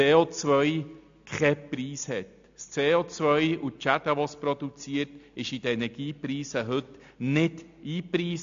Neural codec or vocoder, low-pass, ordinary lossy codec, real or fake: none; 7.2 kHz; AAC, 64 kbps; real